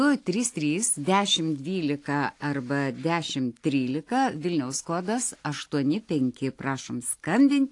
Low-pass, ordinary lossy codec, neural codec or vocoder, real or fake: 10.8 kHz; AAC, 48 kbps; none; real